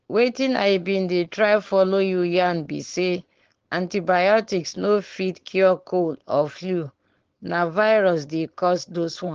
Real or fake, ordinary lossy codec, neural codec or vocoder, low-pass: fake; Opus, 16 kbps; codec, 16 kHz, 4.8 kbps, FACodec; 7.2 kHz